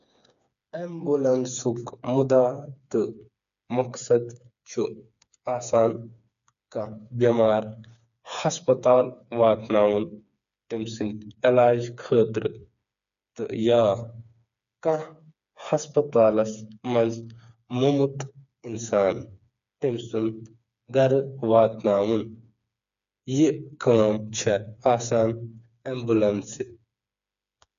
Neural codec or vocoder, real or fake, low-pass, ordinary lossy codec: codec, 16 kHz, 4 kbps, FreqCodec, smaller model; fake; 7.2 kHz; none